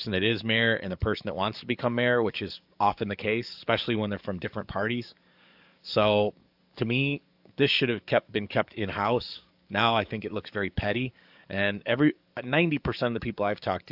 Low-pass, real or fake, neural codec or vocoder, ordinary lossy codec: 5.4 kHz; fake; codec, 44.1 kHz, 7.8 kbps, DAC; AAC, 48 kbps